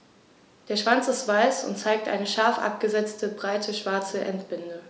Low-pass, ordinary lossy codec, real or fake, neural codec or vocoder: none; none; real; none